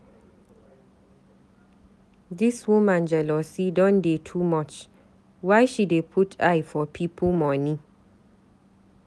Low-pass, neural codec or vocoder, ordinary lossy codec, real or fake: none; none; none; real